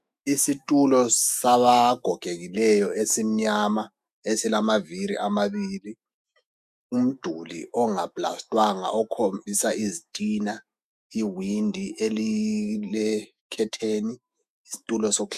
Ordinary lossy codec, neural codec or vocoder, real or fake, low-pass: MP3, 96 kbps; autoencoder, 48 kHz, 128 numbers a frame, DAC-VAE, trained on Japanese speech; fake; 14.4 kHz